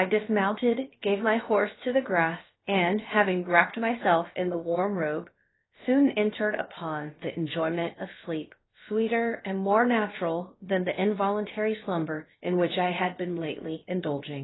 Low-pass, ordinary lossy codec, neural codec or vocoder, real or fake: 7.2 kHz; AAC, 16 kbps; codec, 16 kHz, about 1 kbps, DyCAST, with the encoder's durations; fake